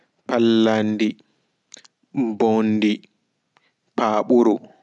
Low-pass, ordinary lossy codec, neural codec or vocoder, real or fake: 10.8 kHz; none; none; real